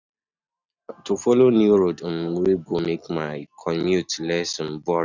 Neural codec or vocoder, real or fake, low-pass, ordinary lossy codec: none; real; 7.2 kHz; none